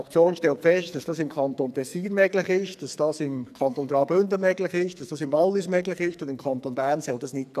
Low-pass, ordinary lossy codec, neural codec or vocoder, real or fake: 14.4 kHz; none; codec, 44.1 kHz, 2.6 kbps, SNAC; fake